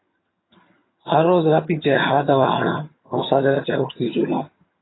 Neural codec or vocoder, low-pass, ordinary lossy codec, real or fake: vocoder, 22.05 kHz, 80 mel bands, HiFi-GAN; 7.2 kHz; AAC, 16 kbps; fake